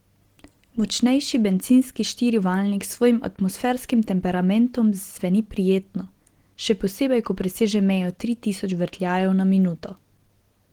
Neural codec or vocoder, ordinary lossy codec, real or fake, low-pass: none; Opus, 16 kbps; real; 19.8 kHz